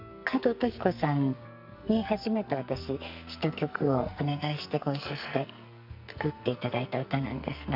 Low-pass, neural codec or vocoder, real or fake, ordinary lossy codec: 5.4 kHz; codec, 44.1 kHz, 2.6 kbps, SNAC; fake; none